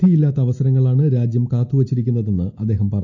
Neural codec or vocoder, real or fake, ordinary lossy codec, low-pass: none; real; none; 7.2 kHz